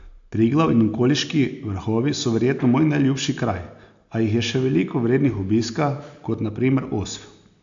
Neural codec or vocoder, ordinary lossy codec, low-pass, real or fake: none; AAC, 64 kbps; 7.2 kHz; real